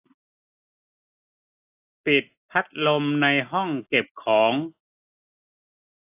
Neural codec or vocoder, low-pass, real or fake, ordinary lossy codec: none; 3.6 kHz; real; AAC, 32 kbps